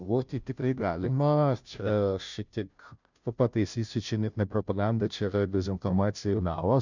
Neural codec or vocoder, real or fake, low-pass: codec, 16 kHz, 0.5 kbps, FunCodec, trained on Chinese and English, 25 frames a second; fake; 7.2 kHz